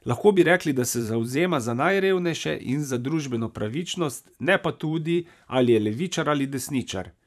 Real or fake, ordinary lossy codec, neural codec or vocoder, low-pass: fake; none; vocoder, 44.1 kHz, 128 mel bands every 512 samples, BigVGAN v2; 14.4 kHz